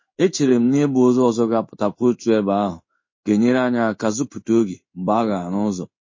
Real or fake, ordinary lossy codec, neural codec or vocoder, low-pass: fake; MP3, 32 kbps; codec, 16 kHz in and 24 kHz out, 1 kbps, XY-Tokenizer; 7.2 kHz